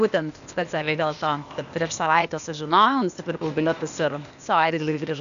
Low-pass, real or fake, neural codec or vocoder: 7.2 kHz; fake; codec, 16 kHz, 0.8 kbps, ZipCodec